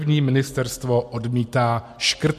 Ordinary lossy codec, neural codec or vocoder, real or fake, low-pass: MP3, 64 kbps; none; real; 14.4 kHz